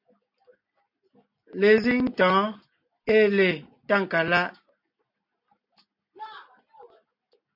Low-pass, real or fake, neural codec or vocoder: 5.4 kHz; real; none